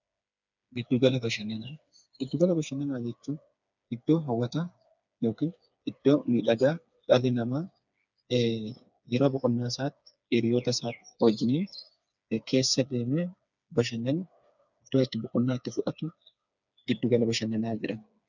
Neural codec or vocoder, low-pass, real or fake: codec, 16 kHz, 4 kbps, FreqCodec, smaller model; 7.2 kHz; fake